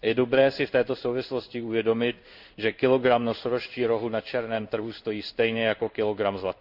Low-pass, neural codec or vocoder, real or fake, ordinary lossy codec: 5.4 kHz; codec, 16 kHz in and 24 kHz out, 1 kbps, XY-Tokenizer; fake; none